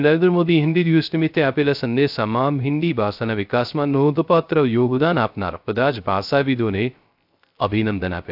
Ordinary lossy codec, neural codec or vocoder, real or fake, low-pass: AAC, 48 kbps; codec, 16 kHz, 0.3 kbps, FocalCodec; fake; 5.4 kHz